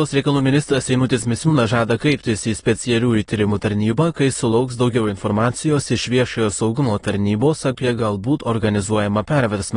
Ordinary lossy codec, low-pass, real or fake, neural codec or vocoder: AAC, 32 kbps; 9.9 kHz; fake; autoencoder, 22.05 kHz, a latent of 192 numbers a frame, VITS, trained on many speakers